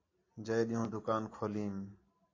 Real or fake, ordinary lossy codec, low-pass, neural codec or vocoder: real; AAC, 32 kbps; 7.2 kHz; none